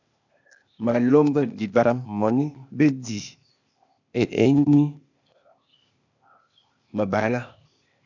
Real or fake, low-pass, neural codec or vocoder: fake; 7.2 kHz; codec, 16 kHz, 0.8 kbps, ZipCodec